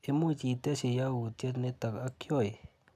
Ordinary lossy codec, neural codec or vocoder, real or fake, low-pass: none; none; real; 14.4 kHz